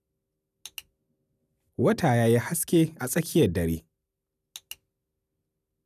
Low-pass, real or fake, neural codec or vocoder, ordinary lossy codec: 14.4 kHz; real; none; none